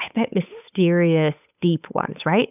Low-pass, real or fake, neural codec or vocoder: 3.6 kHz; fake; codec, 16 kHz, 8 kbps, FunCodec, trained on Chinese and English, 25 frames a second